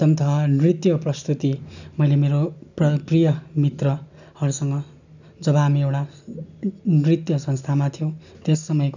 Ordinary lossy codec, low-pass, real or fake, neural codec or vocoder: none; 7.2 kHz; real; none